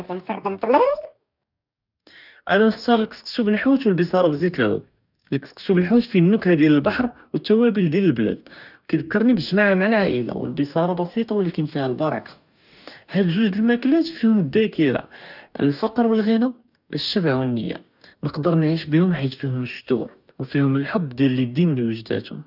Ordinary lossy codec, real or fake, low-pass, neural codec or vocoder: none; fake; 5.4 kHz; codec, 44.1 kHz, 2.6 kbps, DAC